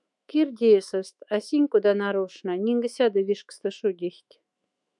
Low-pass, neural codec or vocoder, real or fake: 10.8 kHz; autoencoder, 48 kHz, 128 numbers a frame, DAC-VAE, trained on Japanese speech; fake